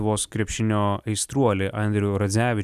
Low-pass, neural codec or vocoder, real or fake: 14.4 kHz; none; real